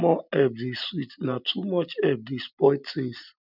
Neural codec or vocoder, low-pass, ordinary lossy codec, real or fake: none; 5.4 kHz; none; real